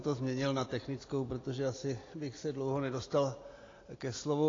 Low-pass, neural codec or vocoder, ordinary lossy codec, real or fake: 7.2 kHz; none; AAC, 32 kbps; real